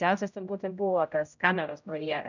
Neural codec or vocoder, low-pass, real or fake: codec, 16 kHz, 0.5 kbps, X-Codec, HuBERT features, trained on general audio; 7.2 kHz; fake